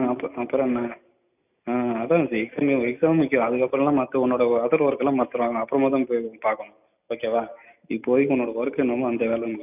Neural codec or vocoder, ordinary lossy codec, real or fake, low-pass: none; none; real; 3.6 kHz